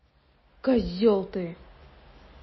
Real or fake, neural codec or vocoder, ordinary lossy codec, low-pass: real; none; MP3, 24 kbps; 7.2 kHz